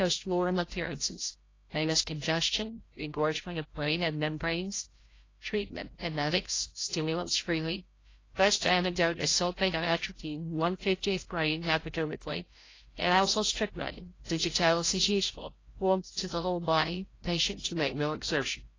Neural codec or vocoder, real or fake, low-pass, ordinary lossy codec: codec, 16 kHz, 0.5 kbps, FreqCodec, larger model; fake; 7.2 kHz; AAC, 32 kbps